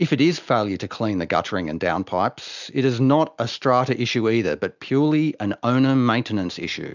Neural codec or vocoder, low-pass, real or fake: none; 7.2 kHz; real